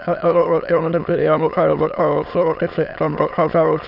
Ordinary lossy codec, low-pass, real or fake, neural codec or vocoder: none; 5.4 kHz; fake; autoencoder, 22.05 kHz, a latent of 192 numbers a frame, VITS, trained on many speakers